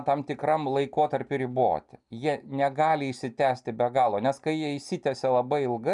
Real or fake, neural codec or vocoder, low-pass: real; none; 10.8 kHz